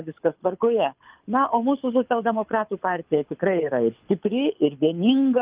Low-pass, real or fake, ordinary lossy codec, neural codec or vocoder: 3.6 kHz; fake; Opus, 32 kbps; codec, 24 kHz, 6 kbps, HILCodec